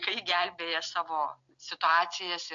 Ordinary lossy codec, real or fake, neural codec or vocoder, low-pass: AAC, 64 kbps; real; none; 7.2 kHz